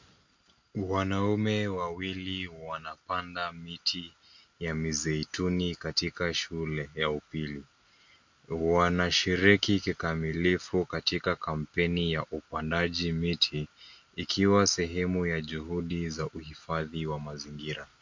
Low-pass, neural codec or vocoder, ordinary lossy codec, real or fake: 7.2 kHz; none; MP3, 48 kbps; real